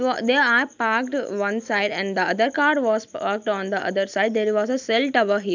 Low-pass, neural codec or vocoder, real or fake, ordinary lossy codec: 7.2 kHz; none; real; none